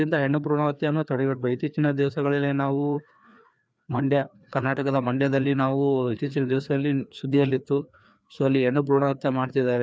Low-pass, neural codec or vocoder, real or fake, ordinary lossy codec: none; codec, 16 kHz, 2 kbps, FreqCodec, larger model; fake; none